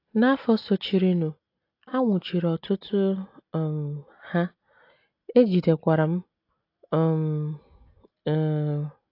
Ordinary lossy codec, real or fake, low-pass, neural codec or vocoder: AAC, 32 kbps; real; 5.4 kHz; none